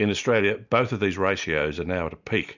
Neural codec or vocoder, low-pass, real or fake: none; 7.2 kHz; real